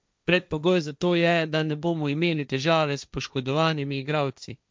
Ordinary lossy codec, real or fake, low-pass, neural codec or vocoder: none; fake; none; codec, 16 kHz, 1.1 kbps, Voila-Tokenizer